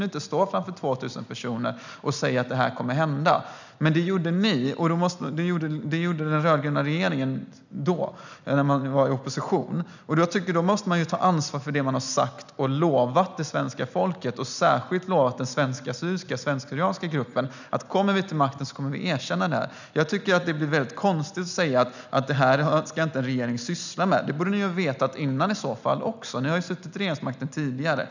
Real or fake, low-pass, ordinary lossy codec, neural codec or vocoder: real; 7.2 kHz; none; none